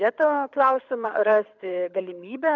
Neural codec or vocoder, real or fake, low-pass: codec, 24 kHz, 6 kbps, HILCodec; fake; 7.2 kHz